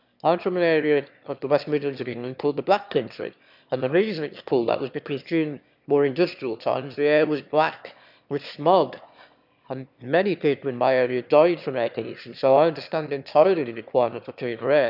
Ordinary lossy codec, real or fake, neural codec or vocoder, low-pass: none; fake; autoencoder, 22.05 kHz, a latent of 192 numbers a frame, VITS, trained on one speaker; 5.4 kHz